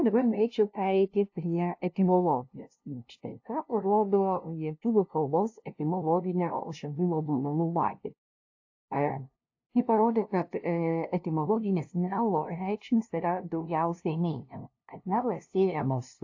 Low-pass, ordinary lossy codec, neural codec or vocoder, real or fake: 7.2 kHz; AAC, 48 kbps; codec, 16 kHz, 0.5 kbps, FunCodec, trained on LibriTTS, 25 frames a second; fake